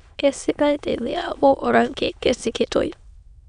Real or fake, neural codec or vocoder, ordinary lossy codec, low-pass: fake; autoencoder, 22.05 kHz, a latent of 192 numbers a frame, VITS, trained on many speakers; none; 9.9 kHz